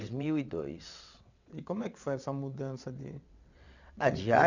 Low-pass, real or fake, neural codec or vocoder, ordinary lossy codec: 7.2 kHz; fake; vocoder, 22.05 kHz, 80 mel bands, WaveNeXt; none